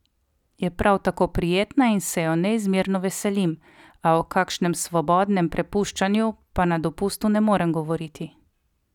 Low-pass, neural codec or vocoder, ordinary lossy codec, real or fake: 19.8 kHz; none; none; real